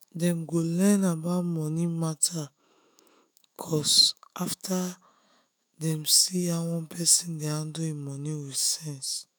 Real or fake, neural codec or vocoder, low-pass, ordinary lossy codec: fake; autoencoder, 48 kHz, 128 numbers a frame, DAC-VAE, trained on Japanese speech; none; none